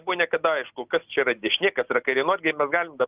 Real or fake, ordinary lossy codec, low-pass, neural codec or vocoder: real; Opus, 24 kbps; 3.6 kHz; none